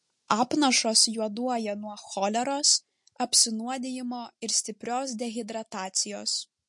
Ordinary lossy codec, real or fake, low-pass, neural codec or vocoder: MP3, 48 kbps; real; 10.8 kHz; none